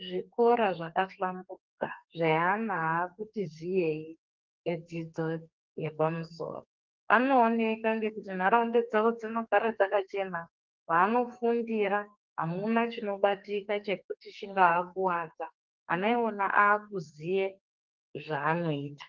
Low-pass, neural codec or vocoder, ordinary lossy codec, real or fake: 7.2 kHz; codec, 32 kHz, 1.9 kbps, SNAC; Opus, 32 kbps; fake